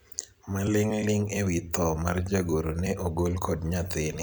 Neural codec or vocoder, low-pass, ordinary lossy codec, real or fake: vocoder, 44.1 kHz, 128 mel bands every 256 samples, BigVGAN v2; none; none; fake